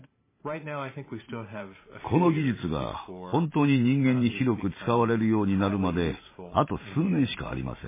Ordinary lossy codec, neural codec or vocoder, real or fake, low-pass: MP3, 16 kbps; vocoder, 44.1 kHz, 128 mel bands every 512 samples, BigVGAN v2; fake; 3.6 kHz